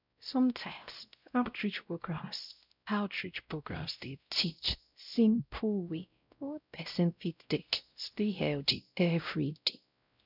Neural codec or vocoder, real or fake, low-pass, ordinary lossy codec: codec, 16 kHz, 0.5 kbps, X-Codec, WavLM features, trained on Multilingual LibriSpeech; fake; 5.4 kHz; none